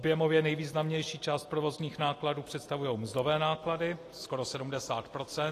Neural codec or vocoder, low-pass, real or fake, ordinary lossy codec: none; 14.4 kHz; real; AAC, 48 kbps